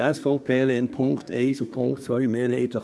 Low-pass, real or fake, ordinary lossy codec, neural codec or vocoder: none; fake; none; codec, 24 kHz, 1 kbps, SNAC